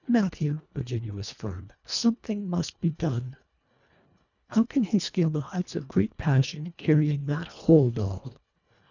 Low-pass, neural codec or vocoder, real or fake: 7.2 kHz; codec, 24 kHz, 1.5 kbps, HILCodec; fake